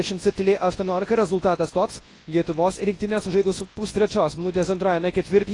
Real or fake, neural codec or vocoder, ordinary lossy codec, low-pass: fake; codec, 24 kHz, 0.9 kbps, WavTokenizer, large speech release; AAC, 32 kbps; 10.8 kHz